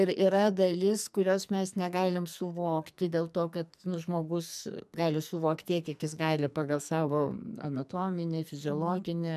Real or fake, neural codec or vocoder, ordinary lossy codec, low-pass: fake; codec, 44.1 kHz, 2.6 kbps, SNAC; MP3, 96 kbps; 14.4 kHz